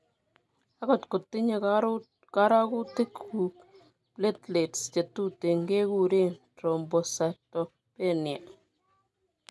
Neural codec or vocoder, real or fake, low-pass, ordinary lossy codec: none; real; none; none